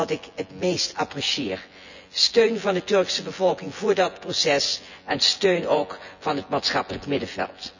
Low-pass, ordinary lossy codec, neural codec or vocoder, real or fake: 7.2 kHz; none; vocoder, 24 kHz, 100 mel bands, Vocos; fake